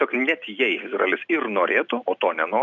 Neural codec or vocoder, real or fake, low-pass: none; real; 7.2 kHz